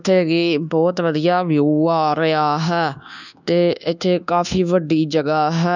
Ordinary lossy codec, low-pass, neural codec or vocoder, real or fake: none; 7.2 kHz; codec, 24 kHz, 1.2 kbps, DualCodec; fake